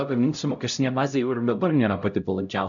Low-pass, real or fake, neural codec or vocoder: 7.2 kHz; fake; codec, 16 kHz, 0.5 kbps, X-Codec, HuBERT features, trained on LibriSpeech